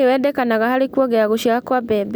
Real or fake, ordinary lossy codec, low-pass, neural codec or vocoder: real; none; none; none